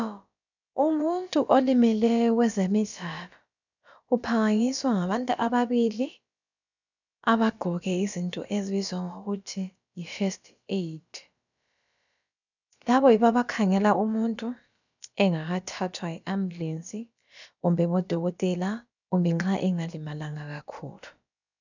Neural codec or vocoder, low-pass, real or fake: codec, 16 kHz, about 1 kbps, DyCAST, with the encoder's durations; 7.2 kHz; fake